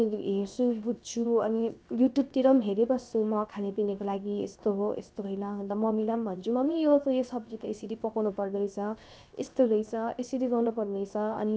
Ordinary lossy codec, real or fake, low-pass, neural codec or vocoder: none; fake; none; codec, 16 kHz, 0.7 kbps, FocalCodec